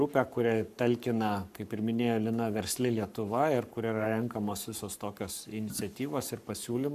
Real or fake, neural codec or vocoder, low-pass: fake; codec, 44.1 kHz, 7.8 kbps, Pupu-Codec; 14.4 kHz